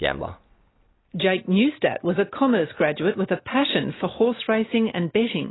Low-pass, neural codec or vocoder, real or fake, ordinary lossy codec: 7.2 kHz; none; real; AAC, 16 kbps